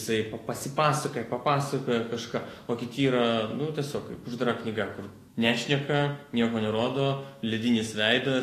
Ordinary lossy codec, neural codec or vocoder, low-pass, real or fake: AAC, 48 kbps; autoencoder, 48 kHz, 128 numbers a frame, DAC-VAE, trained on Japanese speech; 14.4 kHz; fake